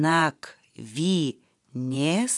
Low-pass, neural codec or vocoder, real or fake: 10.8 kHz; vocoder, 24 kHz, 100 mel bands, Vocos; fake